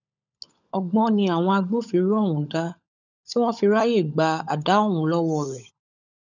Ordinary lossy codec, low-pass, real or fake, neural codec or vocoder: none; 7.2 kHz; fake; codec, 16 kHz, 16 kbps, FunCodec, trained on LibriTTS, 50 frames a second